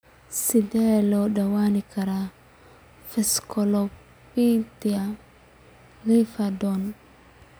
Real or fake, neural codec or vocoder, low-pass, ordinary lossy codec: real; none; none; none